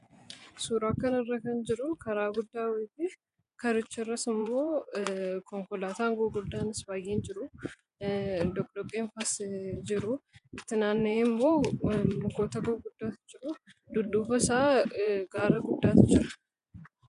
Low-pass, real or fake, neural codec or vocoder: 10.8 kHz; fake; vocoder, 24 kHz, 100 mel bands, Vocos